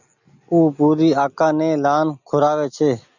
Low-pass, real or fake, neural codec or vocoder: 7.2 kHz; real; none